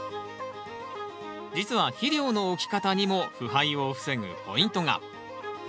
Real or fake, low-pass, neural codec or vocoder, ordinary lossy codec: real; none; none; none